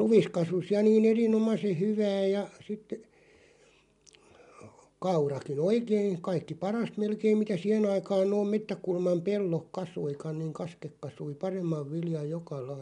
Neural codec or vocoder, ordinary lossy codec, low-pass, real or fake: none; MP3, 64 kbps; 10.8 kHz; real